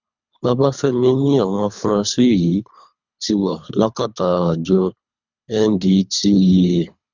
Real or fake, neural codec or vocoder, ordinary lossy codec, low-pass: fake; codec, 24 kHz, 3 kbps, HILCodec; none; 7.2 kHz